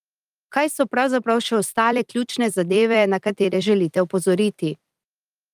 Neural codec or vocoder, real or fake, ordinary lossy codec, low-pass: vocoder, 44.1 kHz, 128 mel bands every 256 samples, BigVGAN v2; fake; Opus, 32 kbps; 14.4 kHz